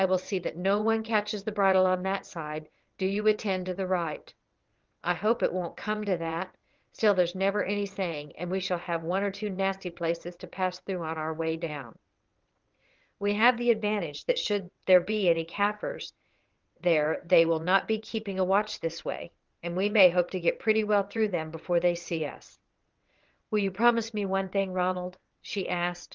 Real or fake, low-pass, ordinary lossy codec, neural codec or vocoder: fake; 7.2 kHz; Opus, 32 kbps; vocoder, 22.05 kHz, 80 mel bands, WaveNeXt